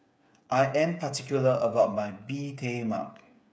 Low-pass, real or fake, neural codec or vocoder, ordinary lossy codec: none; fake; codec, 16 kHz, 8 kbps, FreqCodec, smaller model; none